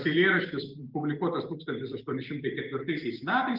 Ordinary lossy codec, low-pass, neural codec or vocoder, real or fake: Opus, 32 kbps; 5.4 kHz; none; real